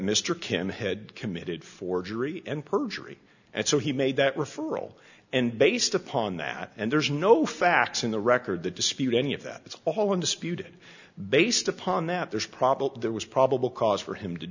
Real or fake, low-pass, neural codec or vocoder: real; 7.2 kHz; none